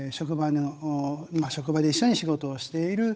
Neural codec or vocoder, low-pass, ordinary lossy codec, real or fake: codec, 16 kHz, 8 kbps, FunCodec, trained on Chinese and English, 25 frames a second; none; none; fake